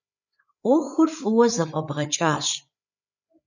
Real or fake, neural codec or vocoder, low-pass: fake; codec, 16 kHz, 8 kbps, FreqCodec, larger model; 7.2 kHz